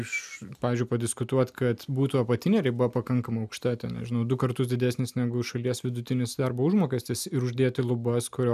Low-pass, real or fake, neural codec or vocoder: 14.4 kHz; real; none